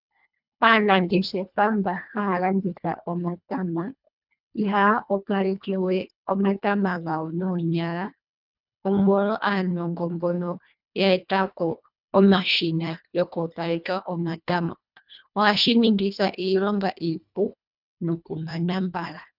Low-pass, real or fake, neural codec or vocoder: 5.4 kHz; fake; codec, 24 kHz, 1.5 kbps, HILCodec